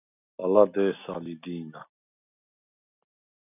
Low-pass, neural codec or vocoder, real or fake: 3.6 kHz; none; real